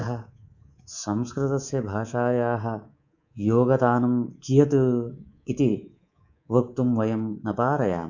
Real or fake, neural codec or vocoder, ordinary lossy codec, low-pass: fake; codec, 24 kHz, 3.1 kbps, DualCodec; none; 7.2 kHz